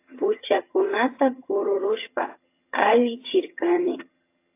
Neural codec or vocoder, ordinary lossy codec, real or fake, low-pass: vocoder, 22.05 kHz, 80 mel bands, HiFi-GAN; AAC, 24 kbps; fake; 3.6 kHz